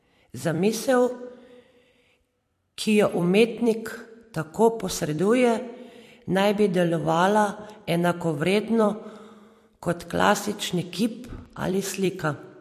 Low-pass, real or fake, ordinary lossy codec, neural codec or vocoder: 14.4 kHz; fake; MP3, 64 kbps; vocoder, 44.1 kHz, 128 mel bands every 256 samples, BigVGAN v2